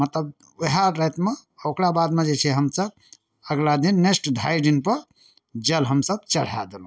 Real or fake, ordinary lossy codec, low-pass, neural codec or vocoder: real; none; none; none